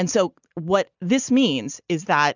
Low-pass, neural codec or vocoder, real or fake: 7.2 kHz; none; real